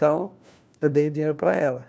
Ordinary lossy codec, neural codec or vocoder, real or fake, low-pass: none; codec, 16 kHz, 1 kbps, FunCodec, trained on LibriTTS, 50 frames a second; fake; none